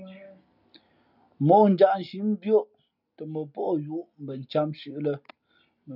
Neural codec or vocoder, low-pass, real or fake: none; 5.4 kHz; real